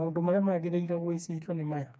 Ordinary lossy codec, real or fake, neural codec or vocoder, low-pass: none; fake; codec, 16 kHz, 2 kbps, FreqCodec, smaller model; none